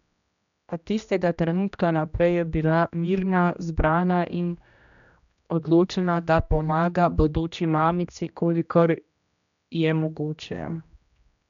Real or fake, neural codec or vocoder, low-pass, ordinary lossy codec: fake; codec, 16 kHz, 1 kbps, X-Codec, HuBERT features, trained on general audio; 7.2 kHz; none